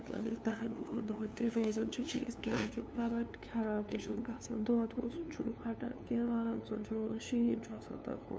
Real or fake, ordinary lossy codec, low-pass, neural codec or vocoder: fake; none; none; codec, 16 kHz, 2 kbps, FunCodec, trained on LibriTTS, 25 frames a second